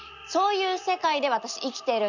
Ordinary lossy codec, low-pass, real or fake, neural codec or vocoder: none; 7.2 kHz; real; none